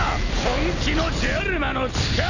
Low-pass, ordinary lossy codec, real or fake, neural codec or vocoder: 7.2 kHz; none; real; none